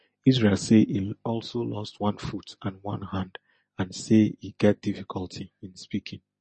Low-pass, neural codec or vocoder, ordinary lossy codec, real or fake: 10.8 kHz; none; MP3, 32 kbps; real